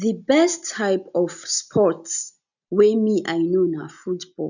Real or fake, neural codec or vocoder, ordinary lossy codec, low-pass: real; none; none; 7.2 kHz